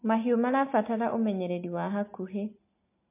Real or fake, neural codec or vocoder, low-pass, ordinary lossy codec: real; none; 3.6 kHz; MP3, 24 kbps